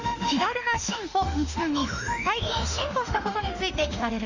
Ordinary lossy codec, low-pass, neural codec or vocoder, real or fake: none; 7.2 kHz; autoencoder, 48 kHz, 32 numbers a frame, DAC-VAE, trained on Japanese speech; fake